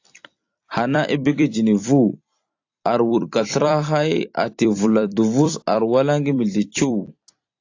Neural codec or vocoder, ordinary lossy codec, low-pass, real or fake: vocoder, 44.1 kHz, 128 mel bands every 256 samples, BigVGAN v2; AAC, 48 kbps; 7.2 kHz; fake